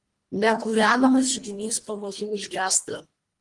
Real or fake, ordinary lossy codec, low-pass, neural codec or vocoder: fake; Opus, 24 kbps; 10.8 kHz; codec, 24 kHz, 1.5 kbps, HILCodec